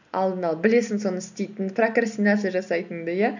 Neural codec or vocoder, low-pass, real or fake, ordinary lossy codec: none; 7.2 kHz; real; none